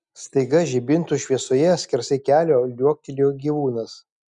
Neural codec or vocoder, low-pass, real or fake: none; 10.8 kHz; real